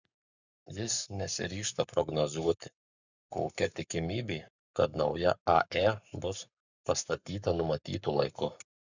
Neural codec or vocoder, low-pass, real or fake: codec, 44.1 kHz, 7.8 kbps, Pupu-Codec; 7.2 kHz; fake